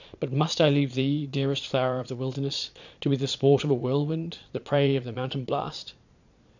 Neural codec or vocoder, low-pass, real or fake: vocoder, 22.05 kHz, 80 mel bands, Vocos; 7.2 kHz; fake